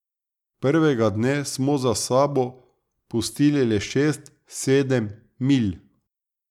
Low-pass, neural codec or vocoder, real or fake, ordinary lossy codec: 19.8 kHz; none; real; none